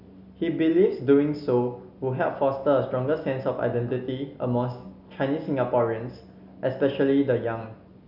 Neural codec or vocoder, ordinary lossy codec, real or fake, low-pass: none; Opus, 64 kbps; real; 5.4 kHz